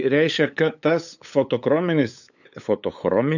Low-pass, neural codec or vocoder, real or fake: 7.2 kHz; codec, 16 kHz, 8 kbps, FunCodec, trained on LibriTTS, 25 frames a second; fake